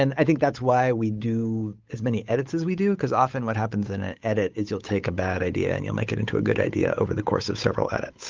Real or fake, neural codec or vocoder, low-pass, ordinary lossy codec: fake; codec, 16 kHz, 8 kbps, FunCodec, trained on Chinese and English, 25 frames a second; 7.2 kHz; Opus, 24 kbps